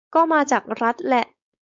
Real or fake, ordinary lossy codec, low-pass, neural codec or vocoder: fake; AAC, 64 kbps; 7.2 kHz; codec, 16 kHz, 4.8 kbps, FACodec